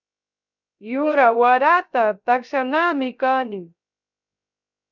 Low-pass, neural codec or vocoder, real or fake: 7.2 kHz; codec, 16 kHz, 0.3 kbps, FocalCodec; fake